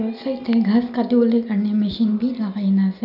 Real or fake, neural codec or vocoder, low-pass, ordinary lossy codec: real; none; 5.4 kHz; none